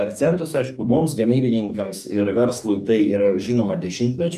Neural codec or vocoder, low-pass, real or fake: codec, 44.1 kHz, 2.6 kbps, DAC; 14.4 kHz; fake